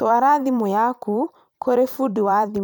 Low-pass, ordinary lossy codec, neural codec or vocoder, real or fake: none; none; vocoder, 44.1 kHz, 128 mel bands every 512 samples, BigVGAN v2; fake